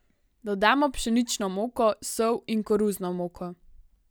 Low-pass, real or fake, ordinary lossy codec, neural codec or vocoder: none; real; none; none